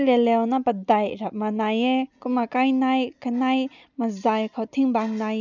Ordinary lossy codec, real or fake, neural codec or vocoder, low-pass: none; real; none; 7.2 kHz